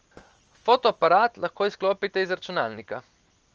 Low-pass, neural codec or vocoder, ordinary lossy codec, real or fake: 7.2 kHz; none; Opus, 24 kbps; real